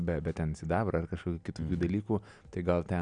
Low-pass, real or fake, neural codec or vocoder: 9.9 kHz; real; none